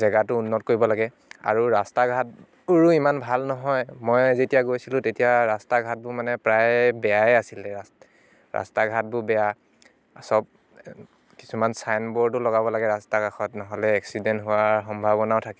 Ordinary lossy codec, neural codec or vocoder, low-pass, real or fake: none; none; none; real